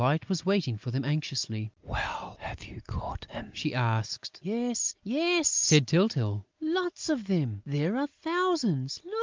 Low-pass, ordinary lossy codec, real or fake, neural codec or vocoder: 7.2 kHz; Opus, 24 kbps; real; none